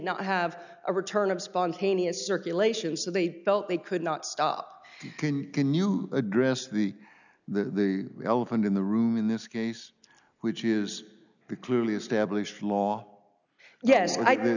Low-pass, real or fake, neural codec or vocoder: 7.2 kHz; real; none